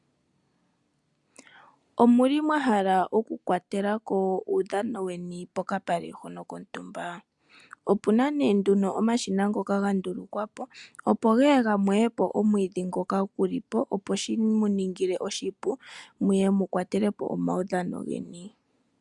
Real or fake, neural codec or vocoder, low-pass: real; none; 10.8 kHz